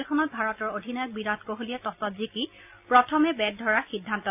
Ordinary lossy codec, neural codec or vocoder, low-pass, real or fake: AAC, 32 kbps; none; 3.6 kHz; real